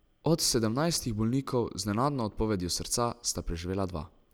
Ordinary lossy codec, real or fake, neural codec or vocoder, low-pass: none; real; none; none